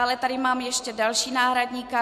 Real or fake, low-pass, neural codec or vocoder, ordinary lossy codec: real; 14.4 kHz; none; MP3, 64 kbps